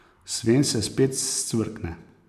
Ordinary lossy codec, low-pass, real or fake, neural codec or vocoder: none; 14.4 kHz; fake; vocoder, 44.1 kHz, 128 mel bands every 256 samples, BigVGAN v2